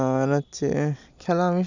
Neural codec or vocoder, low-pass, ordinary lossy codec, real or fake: none; 7.2 kHz; none; real